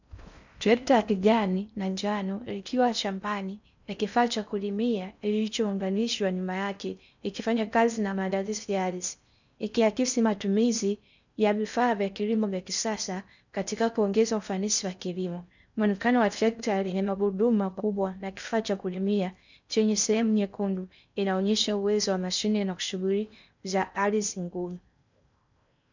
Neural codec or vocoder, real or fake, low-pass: codec, 16 kHz in and 24 kHz out, 0.6 kbps, FocalCodec, streaming, 4096 codes; fake; 7.2 kHz